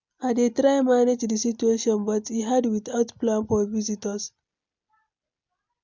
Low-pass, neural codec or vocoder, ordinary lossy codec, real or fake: 7.2 kHz; none; MP3, 64 kbps; real